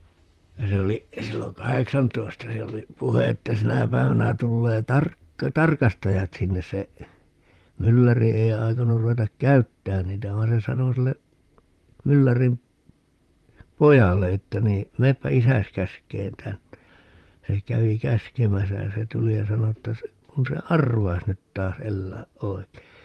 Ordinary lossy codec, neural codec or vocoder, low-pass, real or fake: Opus, 32 kbps; vocoder, 44.1 kHz, 128 mel bands, Pupu-Vocoder; 14.4 kHz; fake